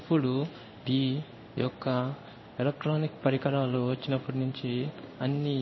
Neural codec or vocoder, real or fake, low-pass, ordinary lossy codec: codec, 16 kHz in and 24 kHz out, 1 kbps, XY-Tokenizer; fake; 7.2 kHz; MP3, 24 kbps